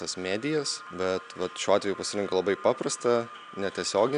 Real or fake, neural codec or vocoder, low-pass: real; none; 9.9 kHz